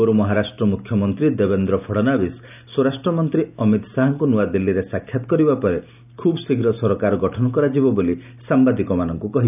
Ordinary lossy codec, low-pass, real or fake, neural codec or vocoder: none; 3.6 kHz; real; none